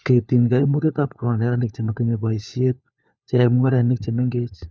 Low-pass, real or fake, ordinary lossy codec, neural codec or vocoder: none; fake; none; codec, 16 kHz, 4 kbps, FunCodec, trained on LibriTTS, 50 frames a second